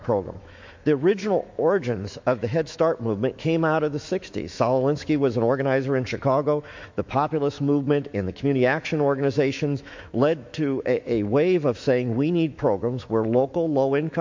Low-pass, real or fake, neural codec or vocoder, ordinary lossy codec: 7.2 kHz; fake; autoencoder, 48 kHz, 128 numbers a frame, DAC-VAE, trained on Japanese speech; MP3, 48 kbps